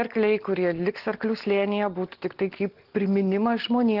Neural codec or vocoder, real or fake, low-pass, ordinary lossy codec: none; real; 5.4 kHz; Opus, 16 kbps